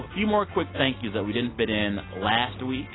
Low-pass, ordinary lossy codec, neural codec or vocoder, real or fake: 7.2 kHz; AAC, 16 kbps; none; real